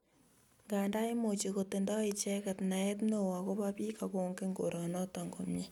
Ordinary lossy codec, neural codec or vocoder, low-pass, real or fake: none; none; 19.8 kHz; real